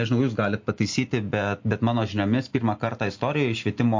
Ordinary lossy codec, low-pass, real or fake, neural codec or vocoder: MP3, 48 kbps; 7.2 kHz; real; none